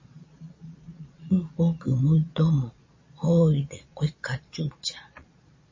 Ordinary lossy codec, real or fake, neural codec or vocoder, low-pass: MP3, 32 kbps; fake; vocoder, 22.05 kHz, 80 mel bands, Vocos; 7.2 kHz